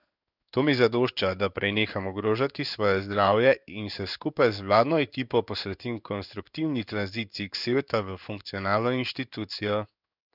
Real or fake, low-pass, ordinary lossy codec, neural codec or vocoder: fake; 5.4 kHz; none; codec, 16 kHz in and 24 kHz out, 1 kbps, XY-Tokenizer